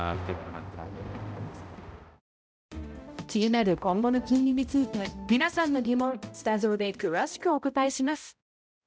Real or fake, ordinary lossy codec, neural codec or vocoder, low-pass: fake; none; codec, 16 kHz, 0.5 kbps, X-Codec, HuBERT features, trained on balanced general audio; none